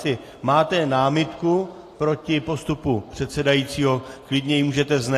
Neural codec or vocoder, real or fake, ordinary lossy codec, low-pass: none; real; AAC, 48 kbps; 14.4 kHz